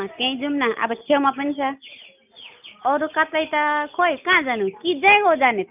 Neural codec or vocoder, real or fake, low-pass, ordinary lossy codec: none; real; 3.6 kHz; none